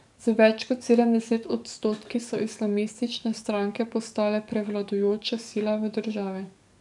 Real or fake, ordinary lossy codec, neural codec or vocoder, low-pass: fake; none; codec, 44.1 kHz, 7.8 kbps, DAC; 10.8 kHz